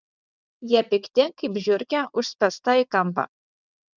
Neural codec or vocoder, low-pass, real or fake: vocoder, 22.05 kHz, 80 mel bands, WaveNeXt; 7.2 kHz; fake